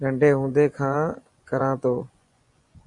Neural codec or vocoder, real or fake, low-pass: vocoder, 24 kHz, 100 mel bands, Vocos; fake; 10.8 kHz